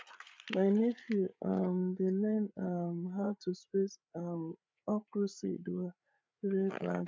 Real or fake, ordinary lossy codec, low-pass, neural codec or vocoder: fake; none; none; codec, 16 kHz, 8 kbps, FreqCodec, larger model